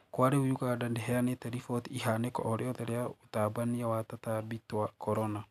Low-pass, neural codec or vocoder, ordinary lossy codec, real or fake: 14.4 kHz; none; none; real